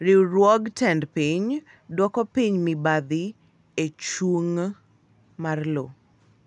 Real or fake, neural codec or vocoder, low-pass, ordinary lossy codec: real; none; 10.8 kHz; none